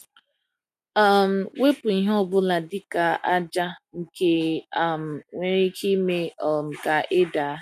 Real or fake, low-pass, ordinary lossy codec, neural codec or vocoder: real; 14.4 kHz; none; none